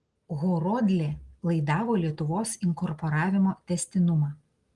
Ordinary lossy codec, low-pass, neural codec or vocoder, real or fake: Opus, 24 kbps; 10.8 kHz; none; real